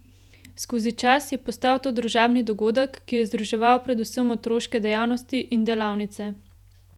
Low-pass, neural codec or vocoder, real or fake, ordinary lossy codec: 19.8 kHz; vocoder, 48 kHz, 128 mel bands, Vocos; fake; none